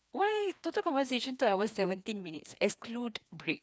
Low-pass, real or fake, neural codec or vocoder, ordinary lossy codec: none; fake; codec, 16 kHz, 2 kbps, FreqCodec, larger model; none